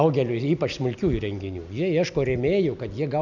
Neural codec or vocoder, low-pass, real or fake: none; 7.2 kHz; real